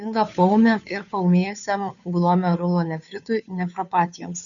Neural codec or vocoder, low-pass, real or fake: codec, 16 kHz, 2 kbps, FunCodec, trained on Chinese and English, 25 frames a second; 7.2 kHz; fake